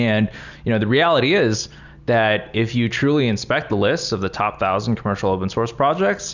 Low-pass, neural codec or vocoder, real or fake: 7.2 kHz; none; real